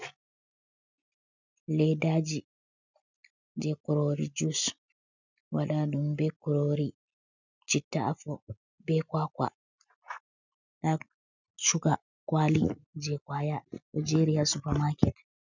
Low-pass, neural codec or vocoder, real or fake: 7.2 kHz; none; real